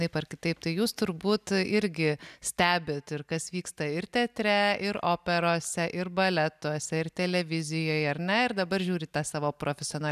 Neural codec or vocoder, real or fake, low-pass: none; real; 14.4 kHz